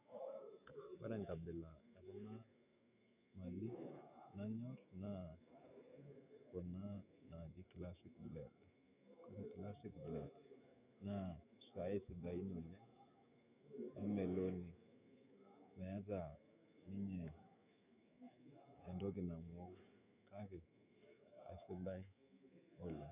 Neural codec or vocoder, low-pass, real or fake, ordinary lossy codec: autoencoder, 48 kHz, 128 numbers a frame, DAC-VAE, trained on Japanese speech; 3.6 kHz; fake; none